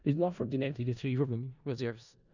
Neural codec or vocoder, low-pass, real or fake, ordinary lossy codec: codec, 16 kHz in and 24 kHz out, 0.4 kbps, LongCat-Audio-Codec, four codebook decoder; 7.2 kHz; fake; none